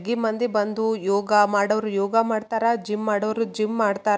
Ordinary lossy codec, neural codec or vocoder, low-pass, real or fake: none; none; none; real